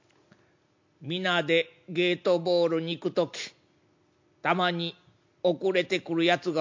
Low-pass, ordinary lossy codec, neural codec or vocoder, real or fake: 7.2 kHz; MP3, 48 kbps; none; real